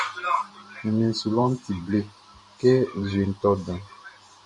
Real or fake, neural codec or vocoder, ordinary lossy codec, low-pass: fake; vocoder, 44.1 kHz, 128 mel bands every 512 samples, BigVGAN v2; MP3, 48 kbps; 10.8 kHz